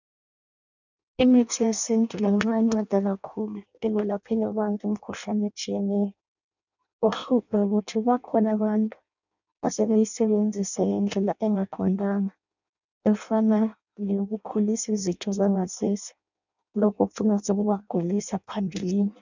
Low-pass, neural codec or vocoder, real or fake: 7.2 kHz; codec, 16 kHz in and 24 kHz out, 0.6 kbps, FireRedTTS-2 codec; fake